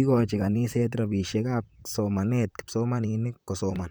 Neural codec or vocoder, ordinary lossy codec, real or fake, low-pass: vocoder, 44.1 kHz, 128 mel bands, Pupu-Vocoder; none; fake; none